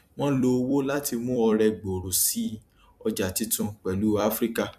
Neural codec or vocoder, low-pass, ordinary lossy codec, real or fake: vocoder, 44.1 kHz, 128 mel bands every 256 samples, BigVGAN v2; 14.4 kHz; none; fake